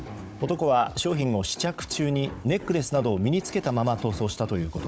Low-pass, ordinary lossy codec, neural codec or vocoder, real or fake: none; none; codec, 16 kHz, 16 kbps, FunCodec, trained on Chinese and English, 50 frames a second; fake